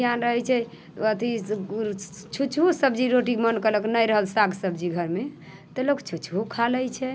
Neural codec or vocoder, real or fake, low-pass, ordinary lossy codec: none; real; none; none